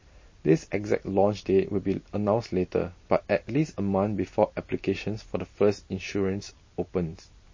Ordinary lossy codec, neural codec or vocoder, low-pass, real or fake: MP3, 32 kbps; none; 7.2 kHz; real